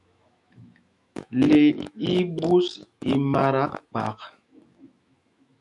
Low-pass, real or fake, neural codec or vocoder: 10.8 kHz; fake; autoencoder, 48 kHz, 128 numbers a frame, DAC-VAE, trained on Japanese speech